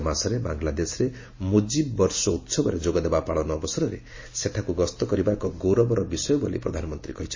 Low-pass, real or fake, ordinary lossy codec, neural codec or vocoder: 7.2 kHz; real; MP3, 32 kbps; none